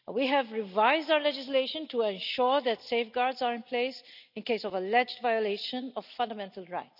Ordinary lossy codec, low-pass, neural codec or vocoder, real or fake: none; 5.4 kHz; none; real